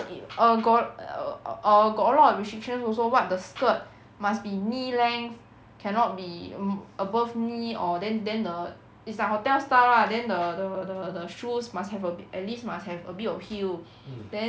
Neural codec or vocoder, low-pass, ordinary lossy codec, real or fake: none; none; none; real